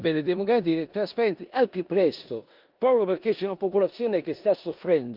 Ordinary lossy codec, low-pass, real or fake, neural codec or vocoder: Opus, 24 kbps; 5.4 kHz; fake; codec, 16 kHz in and 24 kHz out, 0.9 kbps, LongCat-Audio-Codec, four codebook decoder